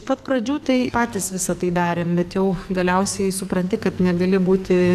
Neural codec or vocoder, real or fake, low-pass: codec, 44.1 kHz, 2.6 kbps, SNAC; fake; 14.4 kHz